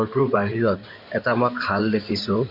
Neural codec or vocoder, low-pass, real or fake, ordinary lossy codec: codec, 16 kHz in and 24 kHz out, 2.2 kbps, FireRedTTS-2 codec; 5.4 kHz; fake; none